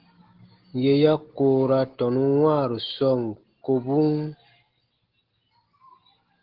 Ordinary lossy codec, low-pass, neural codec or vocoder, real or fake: Opus, 16 kbps; 5.4 kHz; none; real